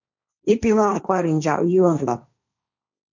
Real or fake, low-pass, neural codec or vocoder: fake; 7.2 kHz; codec, 16 kHz, 1.1 kbps, Voila-Tokenizer